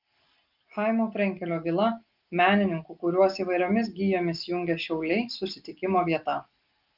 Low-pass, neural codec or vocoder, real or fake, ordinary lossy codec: 5.4 kHz; none; real; Opus, 24 kbps